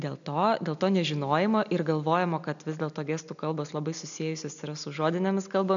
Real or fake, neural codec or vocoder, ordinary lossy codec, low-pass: real; none; MP3, 96 kbps; 7.2 kHz